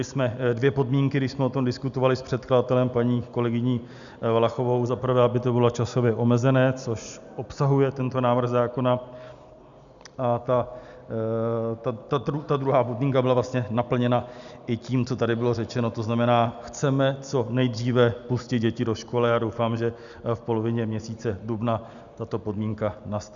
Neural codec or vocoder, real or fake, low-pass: none; real; 7.2 kHz